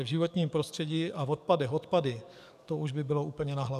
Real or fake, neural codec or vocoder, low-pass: fake; codec, 44.1 kHz, 7.8 kbps, DAC; 14.4 kHz